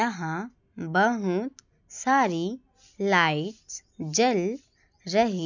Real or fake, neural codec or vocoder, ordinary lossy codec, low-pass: real; none; none; 7.2 kHz